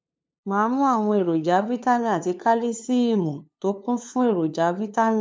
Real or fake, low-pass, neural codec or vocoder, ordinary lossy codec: fake; none; codec, 16 kHz, 2 kbps, FunCodec, trained on LibriTTS, 25 frames a second; none